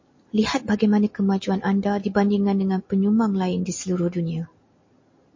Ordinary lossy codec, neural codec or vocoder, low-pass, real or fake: MP3, 32 kbps; none; 7.2 kHz; real